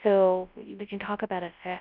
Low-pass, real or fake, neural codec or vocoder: 5.4 kHz; fake; codec, 24 kHz, 0.9 kbps, WavTokenizer, large speech release